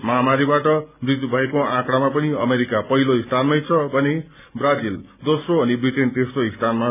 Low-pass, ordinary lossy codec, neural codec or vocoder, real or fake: 3.6 kHz; none; none; real